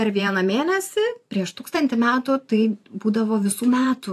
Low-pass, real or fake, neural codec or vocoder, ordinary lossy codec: 14.4 kHz; fake; vocoder, 44.1 kHz, 128 mel bands every 512 samples, BigVGAN v2; AAC, 64 kbps